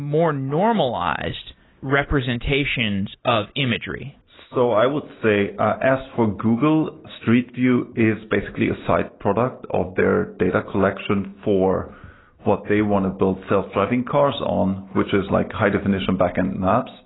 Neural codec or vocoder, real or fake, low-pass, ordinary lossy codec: none; real; 7.2 kHz; AAC, 16 kbps